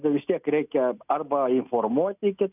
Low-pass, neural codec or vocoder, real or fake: 3.6 kHz; none; real